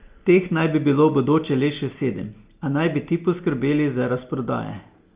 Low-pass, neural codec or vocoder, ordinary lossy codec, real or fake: 3.6 kHz; none; Opus, 24 kbps; real